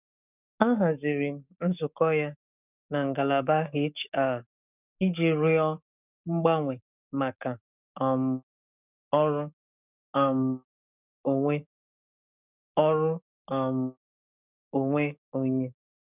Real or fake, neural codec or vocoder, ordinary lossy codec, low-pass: fake; codec, 44.1 kHz, 7.8 kbps, DAC; none; 3.6 kHz